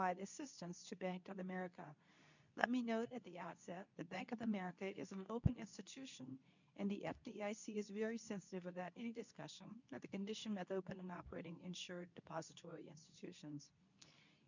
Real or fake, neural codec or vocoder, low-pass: fake; codec, 24 kHz, 0.9 kbps, WavTokenizer, medium speech release version 2; 7.2 kHz